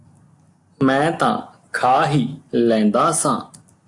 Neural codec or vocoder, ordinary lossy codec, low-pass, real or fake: none; AAC, 48 kbps; 10.8 kHz; real